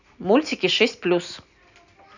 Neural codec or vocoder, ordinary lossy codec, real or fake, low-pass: none; none; real; 7.2 kHz